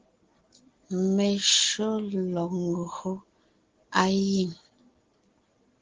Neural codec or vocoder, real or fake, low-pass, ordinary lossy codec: none; real; 7.2 kHz; Opus, 16 kbps